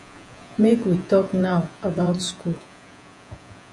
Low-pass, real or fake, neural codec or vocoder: 10.8 kHz; fake; vocoder, 48 kHz, 128 mel bands, Vocos